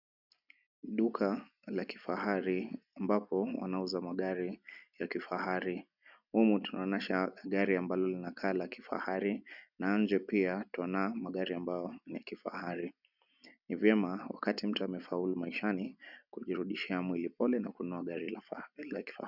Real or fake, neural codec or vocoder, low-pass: real; none; 5.4 kHz